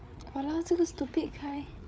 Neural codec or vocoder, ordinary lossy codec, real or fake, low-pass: codec, 16 kHz, 8 kbps, FreqCodec, larger model; none; fake; none